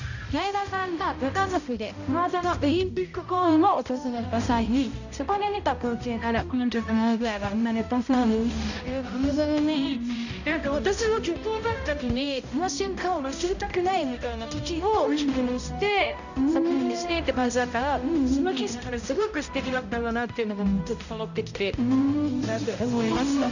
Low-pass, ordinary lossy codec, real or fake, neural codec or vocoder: 7.2 kHz; none; fake; codec, 16 kHz, 0.5 kbps, X-Codec, HuBERT features, trained on balanced general audio